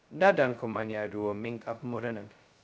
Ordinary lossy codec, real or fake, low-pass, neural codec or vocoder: none; fake; none; codec, 16 kHz, 0.2 kbps, FocalCodec